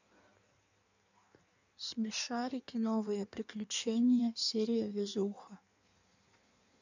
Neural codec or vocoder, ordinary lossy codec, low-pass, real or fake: codec, 16 kHz in and 24 kHz out, 1.1 kbps, FireRedTTS-2 codec; none; 7.2 kHz; fake